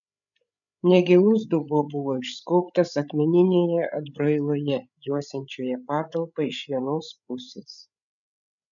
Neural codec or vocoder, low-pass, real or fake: codec, 16 kHz, 8 kbps, FreqCodec, larger model; 7.2 kHz; fake